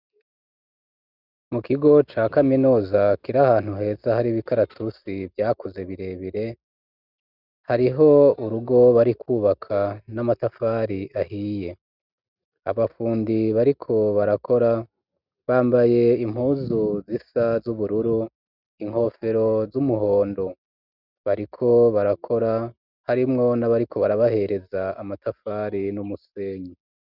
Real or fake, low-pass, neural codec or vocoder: real; 5.4 kHz; none